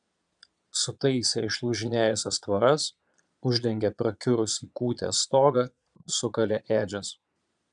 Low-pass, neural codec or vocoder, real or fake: 9.9 kHz; vocoder, 22.05 kHz, 80 mel bands, Vocos; fake